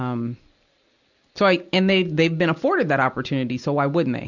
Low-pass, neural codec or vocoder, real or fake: 7.2 kHz; none; real